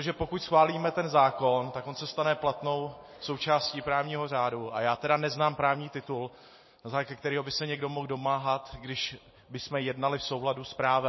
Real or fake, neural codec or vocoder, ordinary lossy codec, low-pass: real; none; MP3, 24 kbps; 7.2 kHz